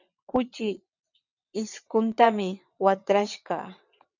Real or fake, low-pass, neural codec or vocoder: fake; 7.2 kHz; vocoder, 22.05 kHz, 80 mel bands, WaveNeXt